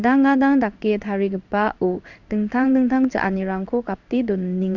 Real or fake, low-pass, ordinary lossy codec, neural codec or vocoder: fake; 7.2 kHz; none; codec, 16 kHz in and 24 kHz out, 1 kbps, XY-Tokenizer